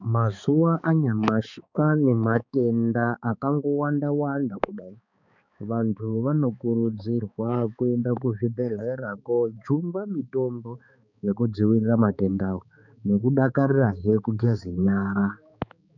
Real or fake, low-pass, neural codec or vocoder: fake; 7.2 kHz; codec, 16 kHz, 4 kbps, X-Codec, HuBERT features, trained on balanced general audio